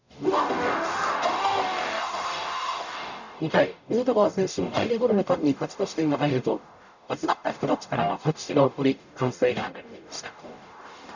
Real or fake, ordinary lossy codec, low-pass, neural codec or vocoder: fake; none; 7.2 kHz; codec, 44.1 kHz, 0.9 kbps, DAC